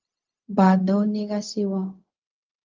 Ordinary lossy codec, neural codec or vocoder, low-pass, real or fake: Opus, 32 kbps; codec, 16 kHz, 0.4 kbps, LongCat-Audio-Codec; 7.2 kHz; fake